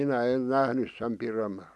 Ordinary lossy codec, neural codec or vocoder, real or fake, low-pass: none; none; real; none